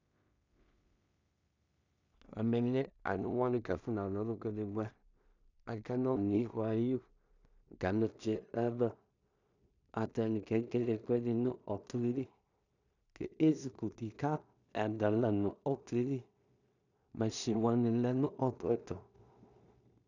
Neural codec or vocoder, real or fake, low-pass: codec, 16 kHz in and 24 kHz out, 0.4 kbps, LongCat-Audio-Codec, two codebook decoder; fake; 7.2 kHz